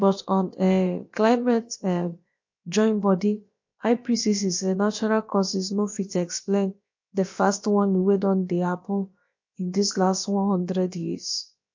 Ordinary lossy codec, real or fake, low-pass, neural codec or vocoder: MP3, 48 kbps; fake; 7.2 kHz; codec, 16 kHz, about 1 kbps, DyCAST, with the encoder's durations